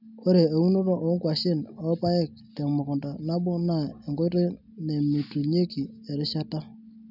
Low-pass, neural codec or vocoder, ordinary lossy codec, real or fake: 5.4 kHz; none; none; real